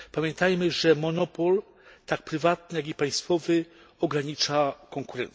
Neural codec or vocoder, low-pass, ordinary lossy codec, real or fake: none; none; none; real